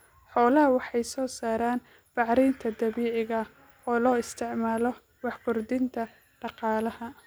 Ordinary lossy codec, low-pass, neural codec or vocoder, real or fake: none; none; none; real